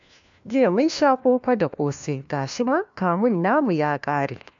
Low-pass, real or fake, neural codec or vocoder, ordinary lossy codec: 7.2 kHz; fake; codec, 16 kHz, 1 kbps, FunCodec, trained on LibriTTS, 50 frames a second; MP3, 64 kbps